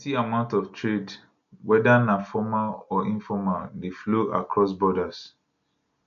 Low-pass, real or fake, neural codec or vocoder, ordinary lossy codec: 7.2 kHz; real; none; none